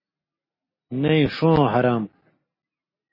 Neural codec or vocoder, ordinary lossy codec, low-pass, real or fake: none; MP3, 24 kbps; 5.4 kHz; real